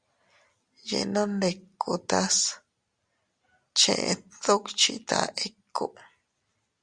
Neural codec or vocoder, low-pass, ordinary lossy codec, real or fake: none; 9.9 kHz; Opus, 64 kbps; real